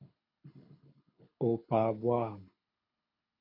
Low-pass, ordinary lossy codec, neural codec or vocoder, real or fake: 5.4 kHz; MP3, 32 kbps; codec, 24 kHz, 6 kbps, HILCodec; fake